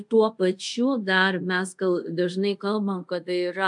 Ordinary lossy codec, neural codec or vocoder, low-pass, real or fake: MP3, 96 kbps; codec, 24 kHz, 0.5 kbps, DualCodec; 10.8 kHz; fake